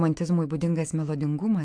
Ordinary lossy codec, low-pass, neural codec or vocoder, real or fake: AAC, 64 kbps; 9.9 kHz; autoencoder, 48 kHz, 128 numbers a frame, DAC-VAE, trained on Japanese speech; fake